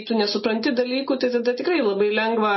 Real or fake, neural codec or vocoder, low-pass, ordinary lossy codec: real; none; 7.2 kHz; MP3, 24 kbps